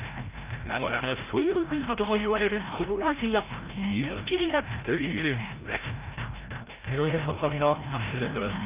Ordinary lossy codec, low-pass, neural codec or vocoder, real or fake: Opus, 24 kbps; 3.6 kHz; codec, 16 kHz, 0.5 kbps, FreqCodec, larger model; fake